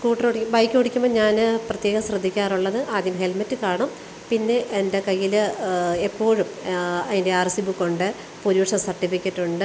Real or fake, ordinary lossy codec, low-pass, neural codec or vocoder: real; none; none; none